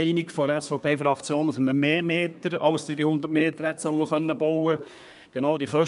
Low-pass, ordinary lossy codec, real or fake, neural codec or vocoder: 10.8 kHz; none; fake; codec, 24 kHz, 1 kbps, SNAC